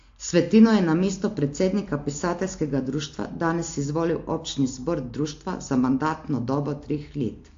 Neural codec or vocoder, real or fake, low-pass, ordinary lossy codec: none; real; 7.2 kHz; AAC, 48 kbps